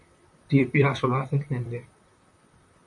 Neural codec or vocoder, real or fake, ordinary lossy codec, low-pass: vocoder, 44.1 kHz, 128 mel bands, Pupu-Vocoder; fake; MP3, 64 kbps; 10.8 kHz